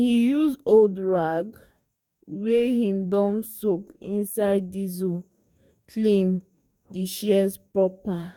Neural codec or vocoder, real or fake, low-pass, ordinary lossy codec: codec, 44.1 kHz, 2.6 kbps, DAC; fake; 19.8 kHz; none